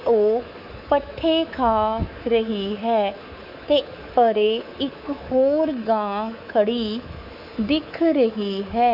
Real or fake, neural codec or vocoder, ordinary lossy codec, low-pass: fake; codec, 24 kHz, 3.1 kbps, DualCodec; none; 5.4 kHz